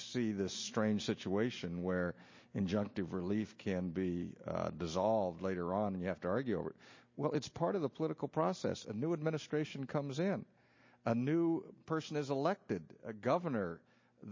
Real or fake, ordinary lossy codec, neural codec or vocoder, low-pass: real; MP3, 32 kbps; none; 7.2 kHz